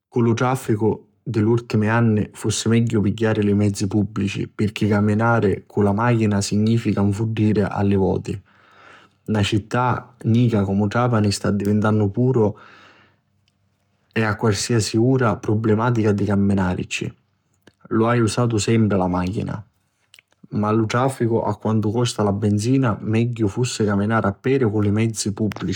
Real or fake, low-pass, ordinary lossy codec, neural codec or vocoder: fake; 19.8 kHz; none; codec, 44.1 kHz, 7.8 kbps, Pupu-Codec